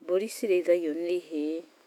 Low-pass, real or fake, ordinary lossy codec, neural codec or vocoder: 19.8 kHz; fake; none; autoencoder, 48 kHz, 128 numbers a frame, DAC-VAE, trained on Japanese speech